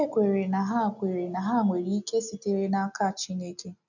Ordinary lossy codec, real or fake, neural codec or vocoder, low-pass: none; real; none; 7.2 kHz